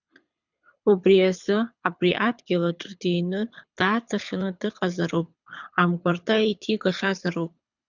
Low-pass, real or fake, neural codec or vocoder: 7.2 kHz; fake; codec, 24 kHz, 6 kbps, HILCodec